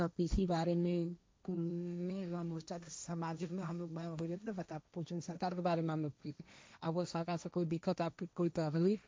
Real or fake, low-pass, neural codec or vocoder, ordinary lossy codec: fake; none; codec, 16 kHz, 1.1 kbps, Voila-Tokenizer; none